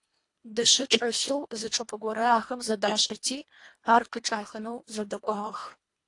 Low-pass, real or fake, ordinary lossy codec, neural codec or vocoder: 10.8 kHz; fake; AAC, 48 kbps; codec, 24 kHz, 1.5 kbps, HILCodec